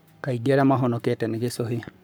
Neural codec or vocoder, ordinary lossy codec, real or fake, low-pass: codec, 44.1 kHz, 7.8 kbps, Pupu-Codec; none; fake; none